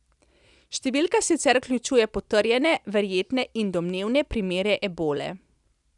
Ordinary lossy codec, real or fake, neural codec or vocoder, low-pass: none; real; none; 10.8 kHz